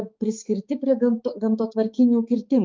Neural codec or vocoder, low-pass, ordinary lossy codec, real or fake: codec, 16 kHz, 4 kbps, X-Codec, HuBERT features, trained on balanced general audio; 7.2 kHz; Opus, 32 kbps; fake